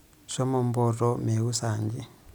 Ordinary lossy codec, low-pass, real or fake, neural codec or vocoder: none; none; real; none